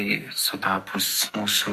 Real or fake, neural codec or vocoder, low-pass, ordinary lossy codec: fake; codec, 44.1 kHz, 2.6 kbps, SNAC; 14.4 kHz; MP3, 64 kbps